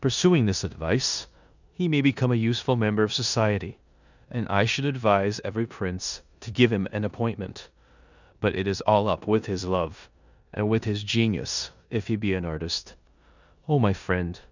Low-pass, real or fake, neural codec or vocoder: 7.2 kHz; fake; codec, 16 kHz in and 24 kHz out, 0.9 kbps, LongCat-Audio-Codec, four codebook decoder